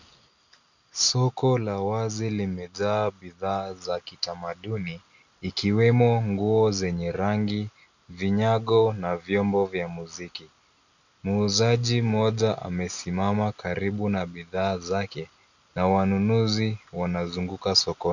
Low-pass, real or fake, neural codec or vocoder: 7.2 kHz; real; none